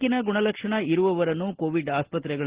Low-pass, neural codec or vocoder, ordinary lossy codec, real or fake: 3.6 kHz; none; Opus, 16 kbps; real